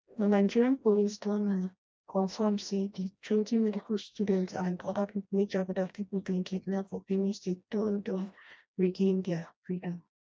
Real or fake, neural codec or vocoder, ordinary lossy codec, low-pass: fake; codec, 16 kHz, 1 kbps, FreqCodec, smaller model; none; none